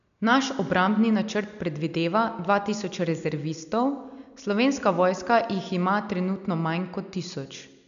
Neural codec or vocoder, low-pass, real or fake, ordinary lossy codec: none; 7.2 kHz; real; none